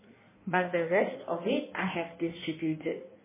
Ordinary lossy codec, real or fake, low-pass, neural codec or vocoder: MP3, 16 kbps; fake; 3.6 kHz; codec, 16 kHz in and 24 kHz out, 1.1 kbps, FireRedTTS-2 codec